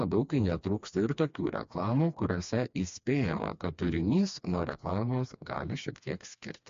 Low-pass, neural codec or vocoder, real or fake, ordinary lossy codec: 7.2 kHz; codec, 16 kHz, 2 kbps, FreqCodec, smaller model; fake; MP3, 48 kbps